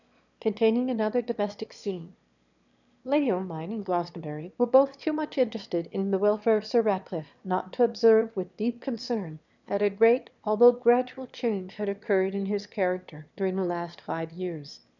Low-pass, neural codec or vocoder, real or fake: 7.2 kHz; autoencoder, 22.05 kHz, a latent of 192 numbers a frame, VITS, trained on one speaker; fake